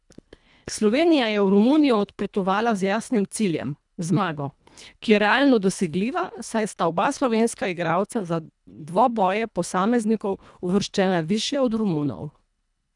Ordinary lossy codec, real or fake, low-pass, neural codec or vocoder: none; fake; 10.8 kHz; codec, 24 kHz, 1.5 kbps, HILCodec